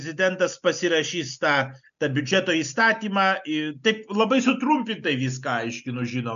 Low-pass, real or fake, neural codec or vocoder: 7.2 kHz; real; none